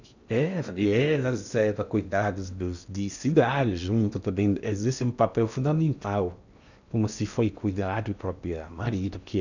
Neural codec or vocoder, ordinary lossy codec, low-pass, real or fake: codec, 16 kHz in and 24 kHz out, 0.8 kbps, FocalCodec, streaming, 65536 codes; none; 7.2 kHz; fake